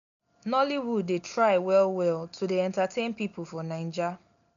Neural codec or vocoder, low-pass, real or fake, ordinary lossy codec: none; 7.2 kHz; real; MP3, 96 kbps